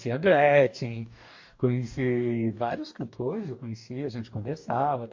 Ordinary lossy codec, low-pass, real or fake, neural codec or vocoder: MP3, 48 kbps; 7.2 kHz; fake; codec, 44.1 kHz, 2.6 kbps, DAC